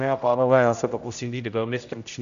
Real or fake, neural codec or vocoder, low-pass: fake; codec, 16 kHz, 0.5 kbps, X-Codec, HuBERT features, trained on general audio; 7.2 kHz